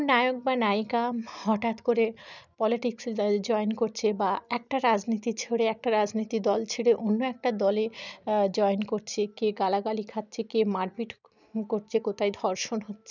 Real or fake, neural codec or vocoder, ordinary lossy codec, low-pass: real; none; none; 7.2 kHz